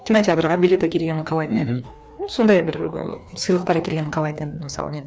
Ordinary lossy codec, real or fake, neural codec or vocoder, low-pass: none; fake; codec, 16 kHz, 2 kbps, FreqCodec, larger model; none